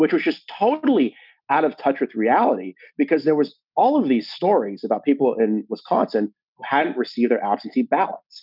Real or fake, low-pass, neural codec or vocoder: real; 5.4 kHz; none